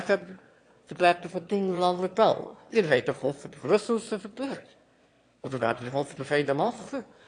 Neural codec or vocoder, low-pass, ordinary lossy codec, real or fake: autoencoder, 22.05 kHz, a latent of 192 numbers a frame, VITS, trained on one speaker; 9.9 kHz; AAC, 48 kbps; fake